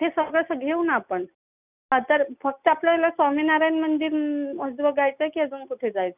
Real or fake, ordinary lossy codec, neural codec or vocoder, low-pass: real; none; none; 3.6 kHz